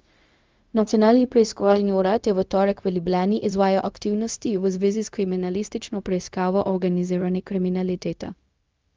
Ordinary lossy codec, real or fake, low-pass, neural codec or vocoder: Opus, 24 kbps; fake; 7.2 kHz; codec, 16 kHz, 0.4 kbps, LongCat-Audio-Codec